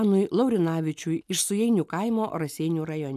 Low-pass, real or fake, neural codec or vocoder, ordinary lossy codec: 14.4 kHz; real; none; MP3, 96 kbps